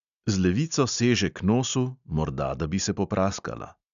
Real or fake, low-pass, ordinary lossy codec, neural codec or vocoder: real; 7.2 kHz; AAC, 96 kbps; none